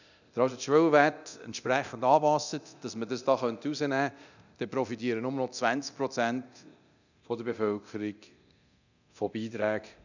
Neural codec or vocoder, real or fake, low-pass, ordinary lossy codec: codec, 24 kHz, 0.9 kbps, DualCodec; fake; 7.2 kHz; none